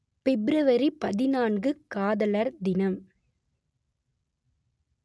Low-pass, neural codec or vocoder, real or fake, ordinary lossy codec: none; none; real; none